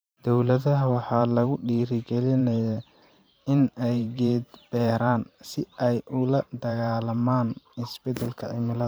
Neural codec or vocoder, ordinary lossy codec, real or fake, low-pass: vocoder, 44.1 kHz, 128 mel bands every 512 samples, BigVGAN v2; none; fake; none